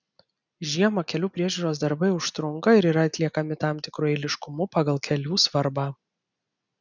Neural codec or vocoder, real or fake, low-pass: none; real; 7.2 kHz